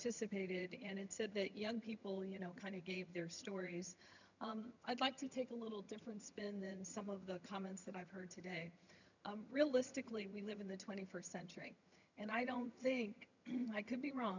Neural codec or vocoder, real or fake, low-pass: vocoder, 22.05 kHz, 80 mel bands, HiFi-GAN; fake; 7.2 kHz